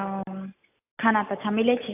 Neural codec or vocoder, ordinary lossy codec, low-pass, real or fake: none; AAC, 32 kbps; 3.6 kHz; real